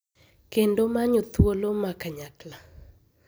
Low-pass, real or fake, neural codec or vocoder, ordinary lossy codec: none; real; none; none